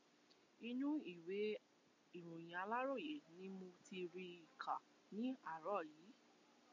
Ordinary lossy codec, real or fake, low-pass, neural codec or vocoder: MP3, 64 kbps; real; 7.2 kHz; none